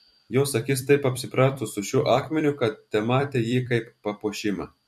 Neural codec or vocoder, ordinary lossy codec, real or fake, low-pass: vocoder, 44.1 kHz, 128 mel bands every 256 samples, BigVGAN v2; MP3, 64 kbps; fake; 14.4 kHz